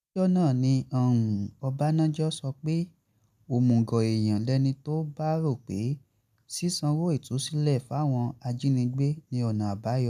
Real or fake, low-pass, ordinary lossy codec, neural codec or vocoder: real; 14.4 kHz; none; none